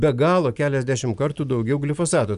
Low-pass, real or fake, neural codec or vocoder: 10.8 kHz; real; none